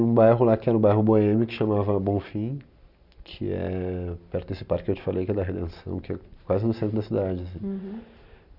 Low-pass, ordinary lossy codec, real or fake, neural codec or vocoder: 5.4 kHz; none; real; none